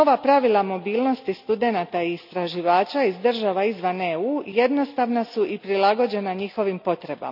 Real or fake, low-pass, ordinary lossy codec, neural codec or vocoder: real; 5.4 kHz; none; none